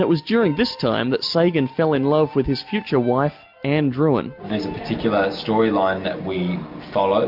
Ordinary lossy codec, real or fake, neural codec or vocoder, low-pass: MP3, 48 kbps; real; none; 5.4 kHz